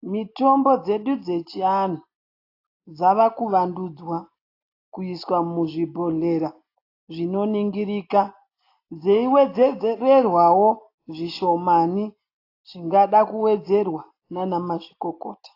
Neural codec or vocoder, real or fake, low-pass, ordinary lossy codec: none; real; 5.4 kHz; AAC, 32 kbps